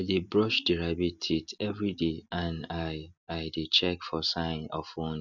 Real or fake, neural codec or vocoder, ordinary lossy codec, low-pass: real; none; none; 7.2 kHz